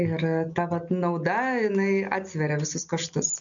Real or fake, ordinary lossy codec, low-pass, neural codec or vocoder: real; MP3, 96 kbps; 7.2 kHz; none